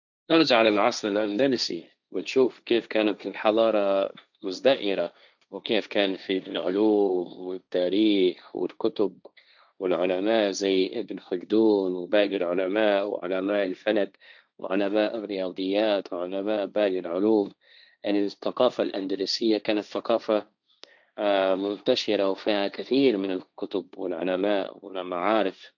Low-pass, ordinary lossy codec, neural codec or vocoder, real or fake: 7.2 kHz; none; codec, 16 kHz, 1.1 kbps, Voila-Tokenizer; fake